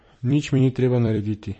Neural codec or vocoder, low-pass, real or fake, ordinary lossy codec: vocoder, 44.1 kHz, 128 mel bands every 256 samples, BigVGAN v2; 10.8 kHz; fake; MP3, 32 kbps